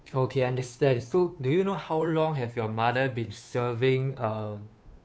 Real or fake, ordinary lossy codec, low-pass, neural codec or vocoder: fake; none; none; codec, 16 kHz, 2 kbps, FunCodec, trained on Chinese and English, 25 frames a second